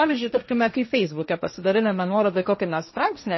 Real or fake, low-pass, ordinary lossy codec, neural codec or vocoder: fake; 7.2 kHz; MP3, 24 kbps; codec, 16 kHz, 1.1 kbps, Voila-Tokenizer